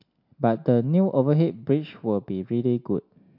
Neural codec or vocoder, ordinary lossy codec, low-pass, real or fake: none; MP3, 48 kbps; 5.4 kHz; real